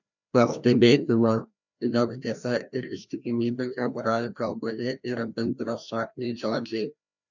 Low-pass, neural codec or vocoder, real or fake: 7.2 kHz; codec, 16 kHz, 1 kbps, FreqCodec, larger model; fake